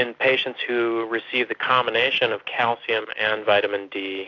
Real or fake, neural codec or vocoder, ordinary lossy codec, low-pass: real; none; AAC, 48 kbps; 7.2 kHz